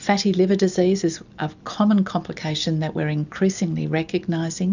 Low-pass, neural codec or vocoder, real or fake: 7.2 kHz; none; real